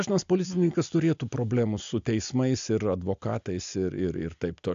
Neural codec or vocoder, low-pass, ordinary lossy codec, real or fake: none; 7.2 kHz; AAC, 64 kbps; real